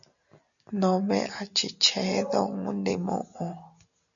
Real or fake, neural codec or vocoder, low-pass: real; none; 7.2 kHz